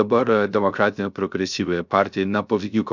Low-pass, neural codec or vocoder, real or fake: 7.2 kHz; codec, 16 kHz, 0.3 kbps, FocalCodec; fake